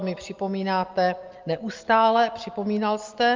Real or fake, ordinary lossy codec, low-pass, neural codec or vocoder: real; Opus, 24 kbps; 7.2 kHz; none